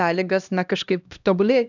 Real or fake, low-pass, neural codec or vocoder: fake; 7.2 kHz; codec, 16 kHz, 1 kbps, X-Codec, HuBERT features, trained on LibriSpeech